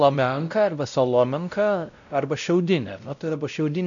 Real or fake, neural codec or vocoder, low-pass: fake; codec, 16 kHz, 0.5 kbps, X-Codec, WavLM features, trained on Multilingual LibriSpeech; 7.2 kHz